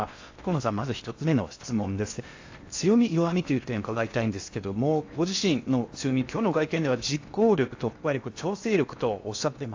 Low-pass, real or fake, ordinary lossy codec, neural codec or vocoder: 7.2 kHz; fake; AAC, 48 kbps; codec, 16 kHz in and 24 kHz out, 0.8 kbps, FocalCodec, streaming, 65536 codes